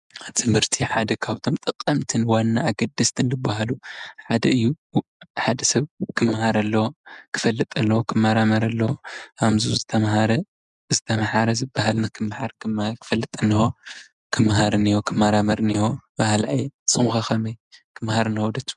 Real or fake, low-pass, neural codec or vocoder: real; 10.8 kHz; none